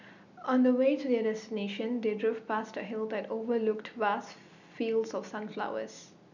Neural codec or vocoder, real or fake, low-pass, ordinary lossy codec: none; real; 7.2 kHz; none